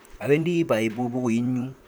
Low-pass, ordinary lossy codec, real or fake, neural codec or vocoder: none; none; fake; vocoder, 44.1 kHz, 128 mel bands, Pupu-Vocoder